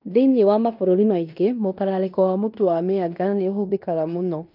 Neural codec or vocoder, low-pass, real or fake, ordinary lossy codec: codec, 16 kHz in and 24 kHz out, 0.9 kbps, LongCat-Audio-Codec, fine tuned four codebook decoder; 5.4 kHz; fake; none